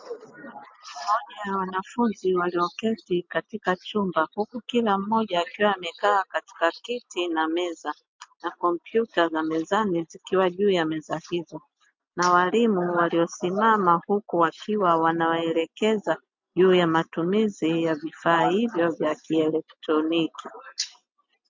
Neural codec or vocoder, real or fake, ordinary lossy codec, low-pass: none; real; MP3, 64 kbps; 7.2 kHz